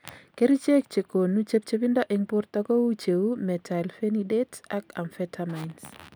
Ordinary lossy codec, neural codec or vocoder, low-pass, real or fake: none; none; none; real